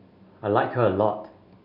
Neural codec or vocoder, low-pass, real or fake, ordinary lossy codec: none; 5.4 kHz; real; none